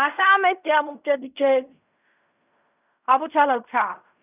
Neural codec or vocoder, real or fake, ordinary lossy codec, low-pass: codec, 16 kHz in and 24 kHz out, 0.4 kbps, LongCat-Audio-Codec, fine tuned four codebook decoder; fake; none; 3.6 kHz